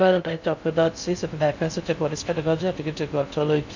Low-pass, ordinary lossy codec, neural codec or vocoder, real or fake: 7.2 kHz; none; codec, 16 kHz in and 24 kHz out, 0.6 kbps, FocalCodec, streaming, 2048 codes; fake